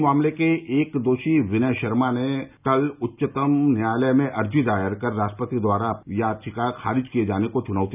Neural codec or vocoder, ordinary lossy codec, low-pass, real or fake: none; none; 3.6 kHz; real